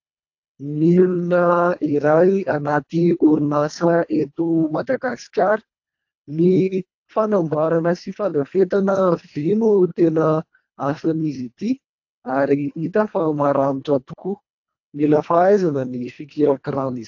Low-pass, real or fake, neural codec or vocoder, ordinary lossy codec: 7.2 kHz; fake; codec, 24 kHz, 1.5 kbps, HILCodec; AAC, 48 kbps